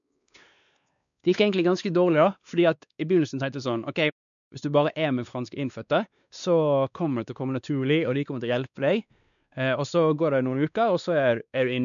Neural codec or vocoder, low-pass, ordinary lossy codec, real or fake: codec, 16 kHz, 2 kbps, X-Codec, WavLM features, trained on Multilingual LibriSpeech; 7.2 kHz; none; fake